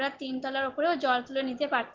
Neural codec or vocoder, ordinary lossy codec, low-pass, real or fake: none; Opus, 16 kbps; 7.2 kHz; real